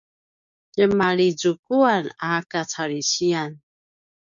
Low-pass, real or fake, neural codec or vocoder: 7.2 kHz; fake; codec, 16 kHz, 6 kbps, DAC